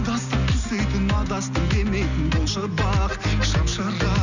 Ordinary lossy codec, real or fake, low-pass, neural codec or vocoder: none; real; 7.2 kHz; none